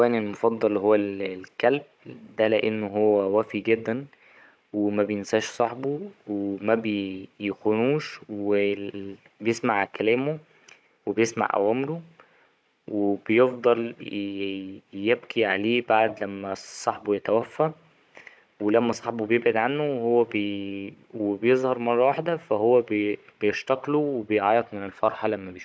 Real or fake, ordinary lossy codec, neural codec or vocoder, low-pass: fake; none; codec, 16 kHz, 16 kbps, FunCodec, trained on Chinese and English, 50 frames a second; none